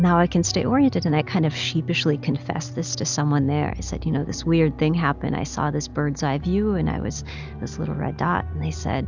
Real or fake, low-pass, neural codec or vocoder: real; 7.2 kHz; none